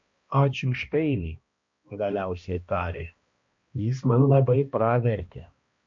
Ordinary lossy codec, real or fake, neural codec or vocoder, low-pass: MP3, 48 kbps; fake; codec, 16 kHz, 1 kbps, X-Codec, HuBERT features, trained on balanced general audio; 7.2 kHz